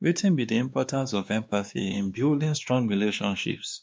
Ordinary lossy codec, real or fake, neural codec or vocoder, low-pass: none; fake; codec, 16 kHz, 2 kbps, X-Codec, WavLM features, trained on Multilingual LibriSpeech; none